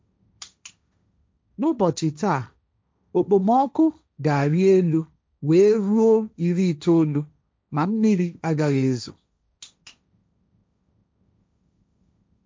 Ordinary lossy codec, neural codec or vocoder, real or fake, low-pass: none; codec, 16 kHz, 1.1 kbps, Voila-Tokenizer; fake; none